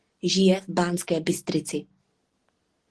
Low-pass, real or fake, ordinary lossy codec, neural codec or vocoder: 10.8 kHz; real; Opus, 16 kbps; none